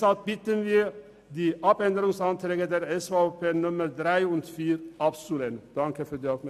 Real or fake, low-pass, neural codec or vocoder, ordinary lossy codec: real; 14.4 kHz; none; MP3, 96 kbps